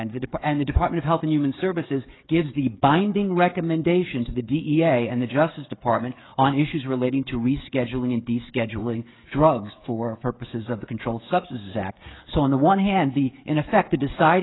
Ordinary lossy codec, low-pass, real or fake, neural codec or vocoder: AAC, 16 kbps; 7.2 kHz; fake; codec, 16 kHz, 16 kbps, FreqCodec, smaller model